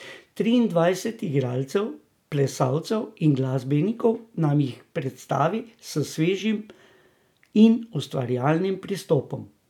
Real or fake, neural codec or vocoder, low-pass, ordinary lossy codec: real; none; 19.8 kHz; none